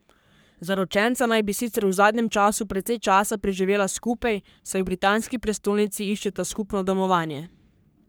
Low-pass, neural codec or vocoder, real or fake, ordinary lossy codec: none; codec, 44.1 kHz, 3.4 kbps, Pupu-Codec; fake; none